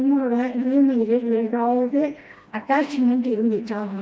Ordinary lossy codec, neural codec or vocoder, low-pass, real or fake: none; codec, 16 kHz, 1 kbps, FreqCodec, smaller model; none; fake